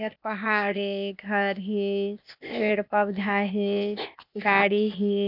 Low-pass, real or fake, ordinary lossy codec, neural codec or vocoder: 5.4 kHz; fake; none; codec, 16 kHz, 0.8 kbps, ZipCodec